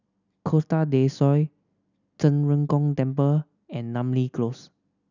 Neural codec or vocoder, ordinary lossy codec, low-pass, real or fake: none; none; 7.2 kHz; real